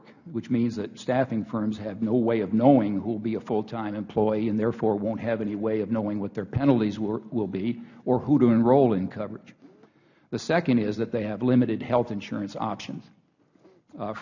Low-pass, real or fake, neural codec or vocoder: 7.2 kHz; real; none